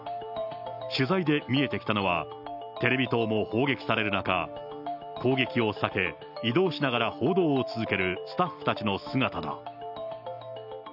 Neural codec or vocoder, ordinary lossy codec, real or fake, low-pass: none; none; real; 5.4 kHz